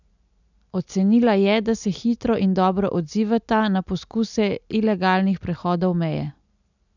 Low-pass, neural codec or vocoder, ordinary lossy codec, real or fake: 7.2 kHz; none; none; real